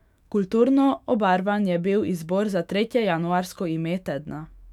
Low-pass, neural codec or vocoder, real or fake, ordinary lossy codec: 19.8 kHz; autoencoder, 48 kHz, 128 numbers a frame, DAC-VAE, trained on Japanese speech; fake; none